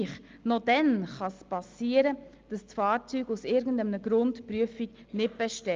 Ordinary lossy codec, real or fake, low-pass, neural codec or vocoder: Opus, 32 kbps; real; 7.2 kHz; none